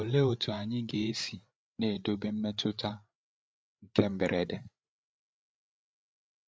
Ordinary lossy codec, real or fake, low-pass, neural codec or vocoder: none; fake; none; codec, 16 kHz, 8 kbps, FreqCodec, larger model